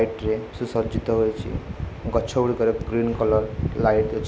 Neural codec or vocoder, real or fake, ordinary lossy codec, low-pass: none; real; none; none